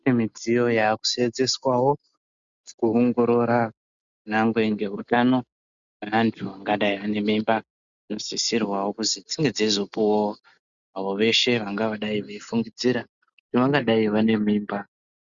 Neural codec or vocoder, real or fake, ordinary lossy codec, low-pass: none; real; Opus, 64 kbps; 7.2 kHz